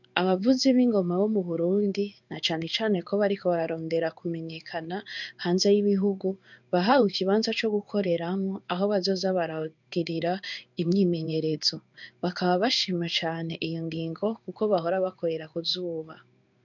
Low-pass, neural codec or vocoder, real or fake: 7.2 kHz; codec, 16 kHz in and 24 kHz out, 1 kbps, XY-Tokenizer; fake